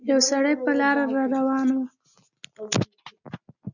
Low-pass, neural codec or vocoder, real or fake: 7.2 kHz; none; real